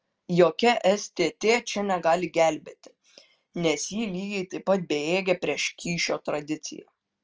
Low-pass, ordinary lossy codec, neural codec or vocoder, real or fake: 7.2 kHz; Opus, 24 kbps; none; real